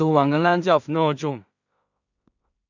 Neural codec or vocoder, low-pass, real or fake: codec, 16 kHz in and 24 kHz out, 0.4 kbps, LongCat-Audio-Codec, two codebook decoder; 7.2 kHz; fake